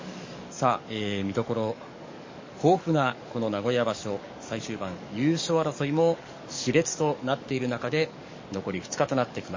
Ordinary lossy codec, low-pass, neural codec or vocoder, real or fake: MP3, 32 kbps; 7.2 kHz; codec, 44.1 kHz, 7.8 kbps, DAC; fake